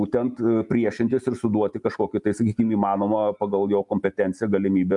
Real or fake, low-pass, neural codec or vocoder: real; 10.8 kHz; none